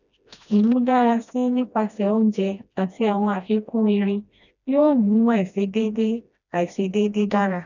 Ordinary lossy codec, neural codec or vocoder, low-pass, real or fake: none; codec, 16 kHz, 1 kbps, FreqCodec, smaller model; 7.2 kHz; fake